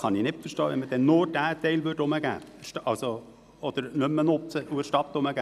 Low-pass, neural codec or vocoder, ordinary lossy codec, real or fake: 14.4 kHz; none; none; real